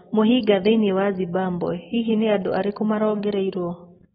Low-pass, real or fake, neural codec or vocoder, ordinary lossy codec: 7.2 kHz; real; none; AAC, 16 kbps